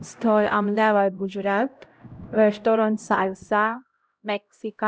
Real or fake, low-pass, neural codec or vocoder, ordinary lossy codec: fake; none; codec, 16 kHz, 0.5 kbps, X-Codec, HuBERT features, trained on LibriSpeech; none